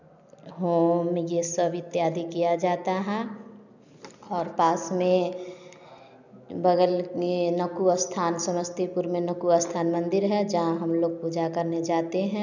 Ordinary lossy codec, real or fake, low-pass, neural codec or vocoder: none; real; 7.2 kHz; none